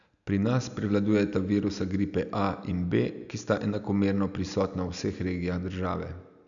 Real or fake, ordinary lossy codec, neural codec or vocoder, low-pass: real; none; none; 7.2 kHz